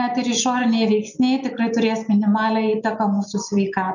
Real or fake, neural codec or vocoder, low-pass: real; none; 7.2 kHz